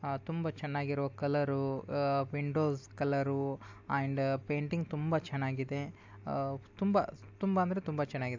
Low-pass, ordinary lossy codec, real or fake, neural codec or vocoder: 7.2 kHz; AAC, 48 kbps; real; none